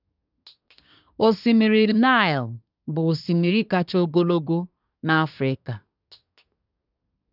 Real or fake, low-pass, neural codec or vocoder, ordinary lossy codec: fake; 5.4 kHz; codec, 24 kHz, 1 kbps, SNAC; none